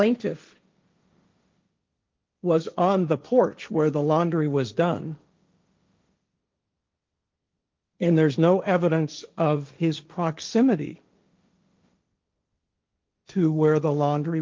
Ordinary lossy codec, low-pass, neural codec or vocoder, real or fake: Opus, 24 kbps; 7.2 kHz; codec, 16 kHz, 1.1 kbps, Voila-Tokenizer; fake